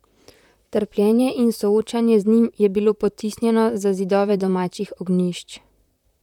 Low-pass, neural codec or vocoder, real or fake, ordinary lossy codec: 19.8 kHz; vocoder, 44.1 kHz, 128 mel bands, Pupu-Vocoder; fake; none